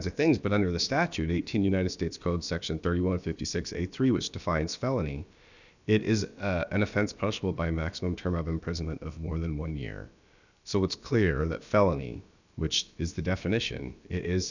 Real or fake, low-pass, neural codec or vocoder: fake; 7.2 kHz; codec, 16 kHz, about 1 kbps, DyCAST, with the encoder's durations